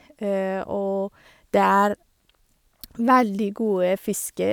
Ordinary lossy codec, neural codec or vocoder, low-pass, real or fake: none; none; none; real